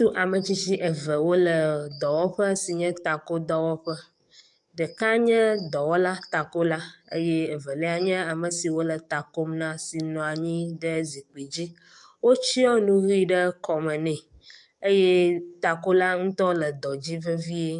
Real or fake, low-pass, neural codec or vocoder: fake; 10.8 kHz; codec, 44.1 kHz, 7.8 kbps, DAC